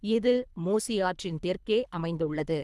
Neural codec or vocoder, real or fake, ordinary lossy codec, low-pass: codec, 24 kHz, 3 kbps, HILCodec; fake; none; none